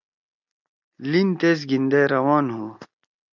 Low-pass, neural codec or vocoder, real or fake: 7.2 kHz; none; real